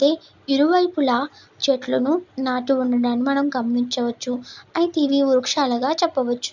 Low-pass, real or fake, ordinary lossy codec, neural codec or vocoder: 7.2 kHz; real; none; none